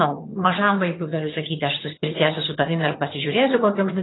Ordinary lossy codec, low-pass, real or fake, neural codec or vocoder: AAC, 16 kbps; 7.2 kHz; fake; vocoder, 22.05 kHz, 80 mel bands, HiFi-GAN